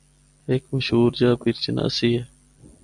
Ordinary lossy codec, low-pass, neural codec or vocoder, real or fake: MP3, 96 kbps; 10.8 kHz; none; real